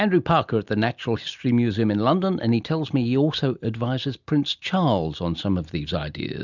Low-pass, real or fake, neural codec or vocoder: 7.2 kHz; real; none